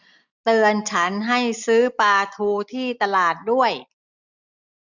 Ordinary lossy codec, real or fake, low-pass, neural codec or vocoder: none; real; 7.2 kHz; none